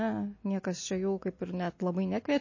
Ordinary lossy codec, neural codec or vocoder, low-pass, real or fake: MP3, 32 kbps; none; 7.2 kHz; real